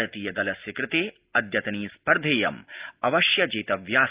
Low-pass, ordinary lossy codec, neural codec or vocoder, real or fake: 3.6 kHz; Opus, 32 kbps; none; real